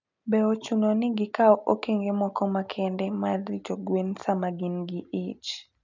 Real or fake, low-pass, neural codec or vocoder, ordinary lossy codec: real; 7.2 kHz; none; none